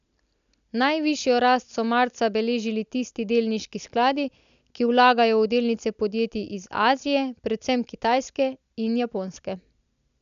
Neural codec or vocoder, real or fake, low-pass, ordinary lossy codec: none; real; 7.2 kHz; none